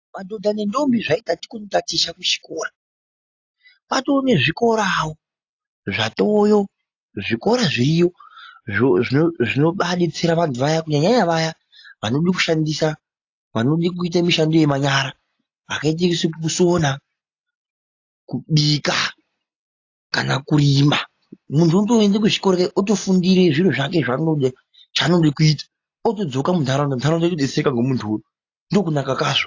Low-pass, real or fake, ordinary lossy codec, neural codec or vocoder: 7.2 kHz; real; AAC, 48 kbps; none